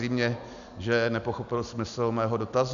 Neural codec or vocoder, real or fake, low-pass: none; real; 7.2 kHz